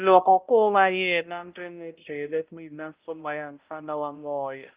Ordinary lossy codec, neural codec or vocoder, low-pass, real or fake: Opus, 24 kbps; codec, 16 kHz, 0.5 kbps, X-Codec, HuBERT features, trained on balanced general audio; 3.6 kHz; fake